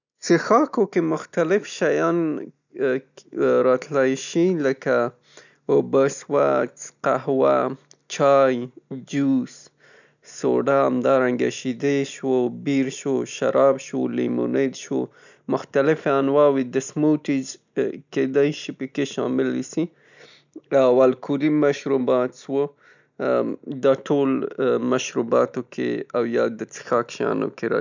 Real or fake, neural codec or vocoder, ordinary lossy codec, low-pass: real; none; none; 7.2 kHz